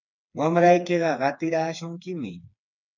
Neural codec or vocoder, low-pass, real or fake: codec, 16 kHz, 4 kbps, FreqCodec, smaller model; 7.2 kHz; fake